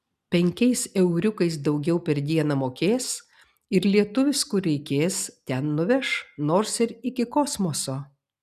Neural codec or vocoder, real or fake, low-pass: none; real; 14.4 kHz